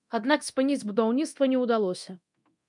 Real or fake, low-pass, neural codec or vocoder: fake; 10.8 kHz; codec, 24 kHz, 0.9 kbps, DualCodec